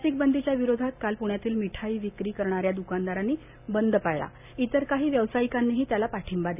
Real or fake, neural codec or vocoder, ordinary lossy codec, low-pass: real; none; none; 3.6 kHz